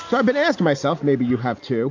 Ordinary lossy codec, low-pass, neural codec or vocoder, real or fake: AAC, 48 kbps; 7.2 kHz; none; real